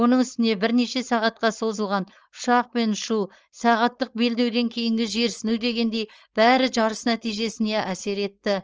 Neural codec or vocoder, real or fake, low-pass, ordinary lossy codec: codec, 16 kHz, 4.8 kbps, FACodec; fake; 7.2 kHz; Opus, 24 kbps